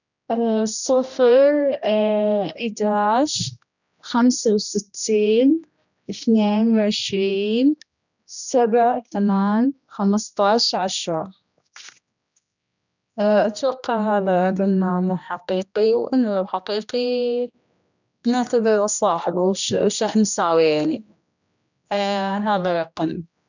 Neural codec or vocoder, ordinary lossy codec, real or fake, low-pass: codec, 16 kHz, 1 kbps, X-Codec, HuBERT features, trained on general audio; none; fake; 7.2 kHz